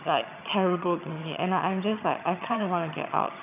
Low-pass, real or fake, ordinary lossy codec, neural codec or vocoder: 3.6 kHz; fake; none; vocoder, 22.05 kHz, 80 mel bands, HiFi-GAN